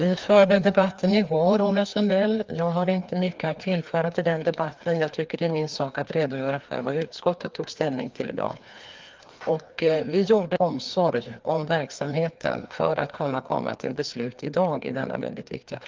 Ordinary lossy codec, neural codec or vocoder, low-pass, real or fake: Opus, 32 kbps; codec, 16 kHz, 2 kbps, FreqCodec, larger model; 7.2 kHz; fake